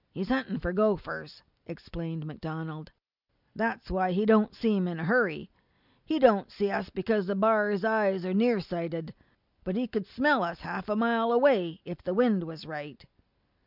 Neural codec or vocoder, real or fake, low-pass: none; real; 5.4 kHz